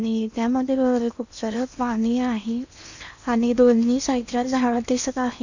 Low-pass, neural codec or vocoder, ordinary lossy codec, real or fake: 7.2 kHz; codec, 16 kHz in and 24 kHz out, 0.8 kbps, FocalCodec, streaming, 65536 codes; none; fake